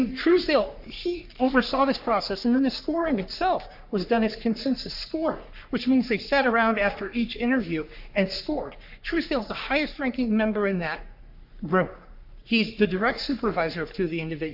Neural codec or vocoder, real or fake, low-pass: codec, 44.1 kHz, 3.4 kbps, Pupu-Codec; fake; 5.4 kHz